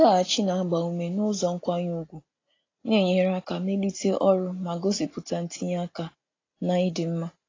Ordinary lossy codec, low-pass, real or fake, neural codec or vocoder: AAC, 32 kbps; 7.2 kHz; real; none